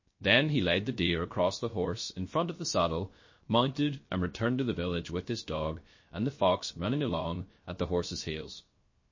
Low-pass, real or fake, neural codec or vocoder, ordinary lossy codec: 7.2 kHz; fake; codec, 16 kHz, 0.3 kbps, FocalCodec; MP3, 32 kbps